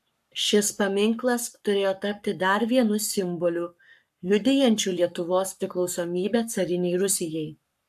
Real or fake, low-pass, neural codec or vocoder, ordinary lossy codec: fake; 14.4 kHz; codec, 44.1 kHz, 7.8 kbps, Pupu-Codec; AAC, 96 kbps